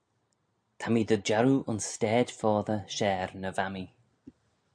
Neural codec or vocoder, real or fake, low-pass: none; real; 9.9 kHz